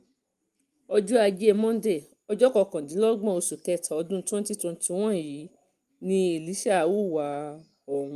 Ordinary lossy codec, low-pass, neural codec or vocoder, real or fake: Opus, 32 kbps; 14.4 kHz; none; real